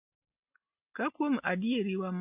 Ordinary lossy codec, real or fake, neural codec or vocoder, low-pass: none; real; none; 3.6 kHz